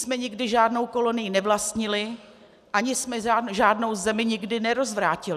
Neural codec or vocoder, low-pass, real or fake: none; 14.4 kHz; real